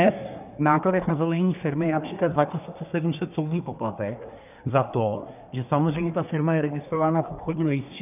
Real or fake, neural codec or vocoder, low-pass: fake; codec, 24 kHz, 1 kbps, SNAC; 3.6 kHz